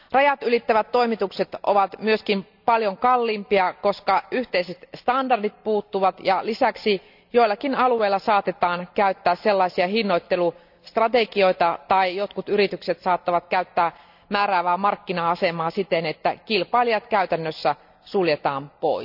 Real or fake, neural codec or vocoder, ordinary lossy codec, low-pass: real; none; none; 5.4 kHz